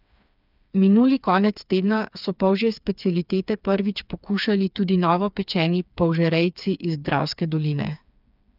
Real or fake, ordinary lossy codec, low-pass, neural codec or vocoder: fake; none; 5.4 kHz; codec, 16 kHz, 4 kbps, FreqCodec, smaller model